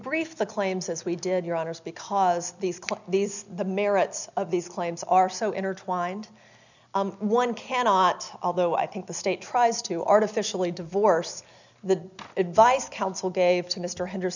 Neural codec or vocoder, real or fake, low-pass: none; real; 7.2 kHz